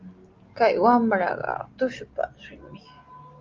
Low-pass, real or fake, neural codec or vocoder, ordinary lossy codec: 7.2 kHz; real; none; Opus, 24 kbps